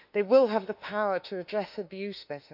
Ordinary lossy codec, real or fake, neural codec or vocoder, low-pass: none; fake; autoencoder, 48 kHz, 32 numbers a frame, DAC-VAE, trained on Japanese speech; 5.4 kHz